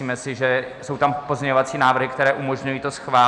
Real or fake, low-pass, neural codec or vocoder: real; 10.8 kHz; none